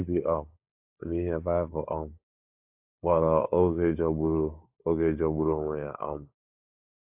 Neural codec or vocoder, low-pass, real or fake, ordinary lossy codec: codec, 16 kHz, 4 kbps, FunCodec, trained on LibriTTS, 50 frames a second; 3.6 kHz; fake; AAC, 32 kbps